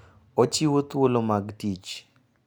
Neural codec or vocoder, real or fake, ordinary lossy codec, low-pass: none; real; none; none